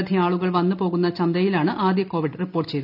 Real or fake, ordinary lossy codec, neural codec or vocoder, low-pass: real; none; none; 5.4 kHz